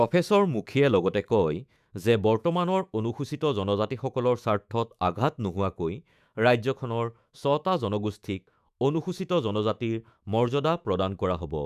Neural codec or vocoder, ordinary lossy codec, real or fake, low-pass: autoencoder, 48 kHz, 128 numbers a frame, DAC-VAE, trained on Japanese speech; none; fake; 14.4 kHz